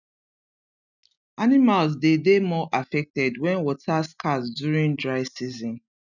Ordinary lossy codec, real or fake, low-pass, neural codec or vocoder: none; real; 7.2 kHz; none